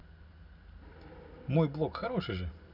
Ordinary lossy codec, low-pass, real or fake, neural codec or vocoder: none; 5.4 kHz; real; none